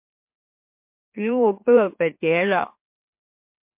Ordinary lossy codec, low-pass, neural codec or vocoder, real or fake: MP3, 32 kbps; 3.6 kHz; autoencoder, 44.1 kHz, a latent of 192 numbers a frame, MeloTTS; fake